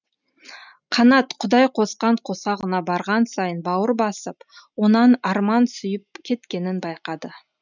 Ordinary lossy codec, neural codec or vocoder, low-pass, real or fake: none; none; 7.2 kHz; real